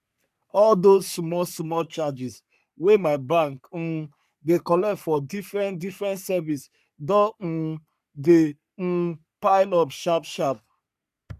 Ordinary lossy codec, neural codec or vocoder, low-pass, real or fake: none; codec, 44.1 kHz, 3.4 kbps, Pupu-Codec; 14.4 kHz; fake